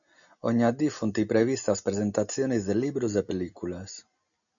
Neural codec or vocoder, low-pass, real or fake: none; 7.2 kHz; real